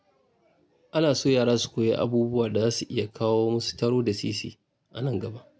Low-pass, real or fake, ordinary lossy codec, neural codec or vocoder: none; real; none; none